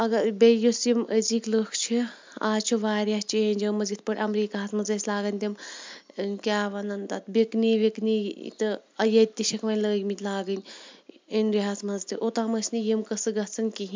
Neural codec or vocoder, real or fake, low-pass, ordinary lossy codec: none; real; 7.2 kHz; MP3, 64 kbps